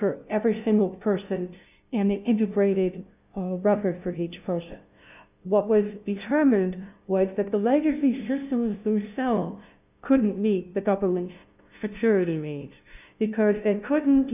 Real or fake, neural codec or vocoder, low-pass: fake; codec, 16 kHz, 0.5 kbps, FunCodec, trained on LibriTTS, 25 frames a second; 3.6 kHz